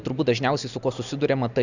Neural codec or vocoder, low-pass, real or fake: none; 7.2 kHz; real